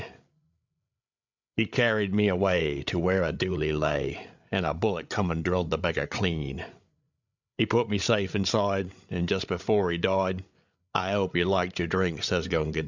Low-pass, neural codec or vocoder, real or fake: 7.2 kHz; codec, 16 kHz, 8 kbps, FreqCodec, larger model; fake